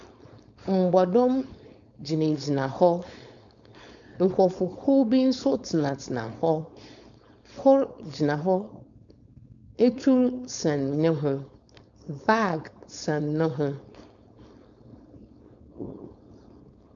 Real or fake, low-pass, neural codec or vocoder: fake; 7.2 kHz; codec, 16 kHz, 4.8 kbps, FACodec